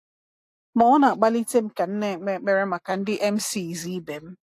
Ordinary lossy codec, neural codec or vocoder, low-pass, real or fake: AAC, 64 kbps; none; 14.4 kHz; real